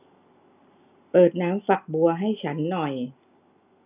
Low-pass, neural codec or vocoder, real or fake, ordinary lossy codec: 3.6 kHz; none; real; none